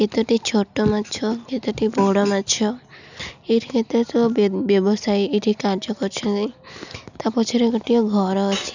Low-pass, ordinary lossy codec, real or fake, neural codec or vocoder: 7.2 kHz; none; real; none